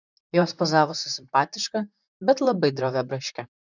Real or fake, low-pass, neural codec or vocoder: fake; 7.2 kHz; vocoder, 44.1 kHz, 128 mel bands, Pupu-Vocoder